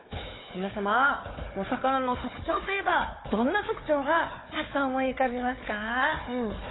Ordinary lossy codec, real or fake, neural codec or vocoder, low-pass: AAC, 16 kbps; fake; codec, 16 kHz, 4 kbps, FunCodec, trained on Chinese and English, 50 frames a second; 7.2 kHz